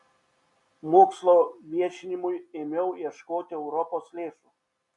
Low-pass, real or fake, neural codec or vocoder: 10.8 kHz; real; none